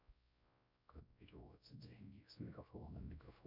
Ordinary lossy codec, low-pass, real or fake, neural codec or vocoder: Opus, 64 kbps; 5.4 kHz; fake; codec, 16 kHz, 0.5 kbps, X-Codec, WavLM features, trained on Multilingual LibriSpeech